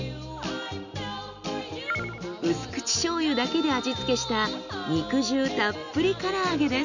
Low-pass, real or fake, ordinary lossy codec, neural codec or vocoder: 7.2 kHz; real; none; none